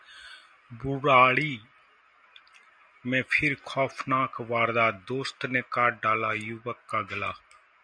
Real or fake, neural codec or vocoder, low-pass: real; none; 9.9 kHz